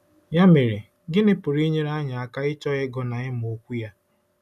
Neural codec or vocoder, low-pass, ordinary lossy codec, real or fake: none; 14.4 kHz; none; real